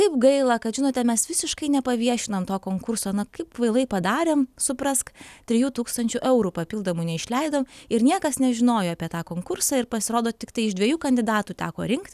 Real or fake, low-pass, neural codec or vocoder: real; 14.4 kHz; none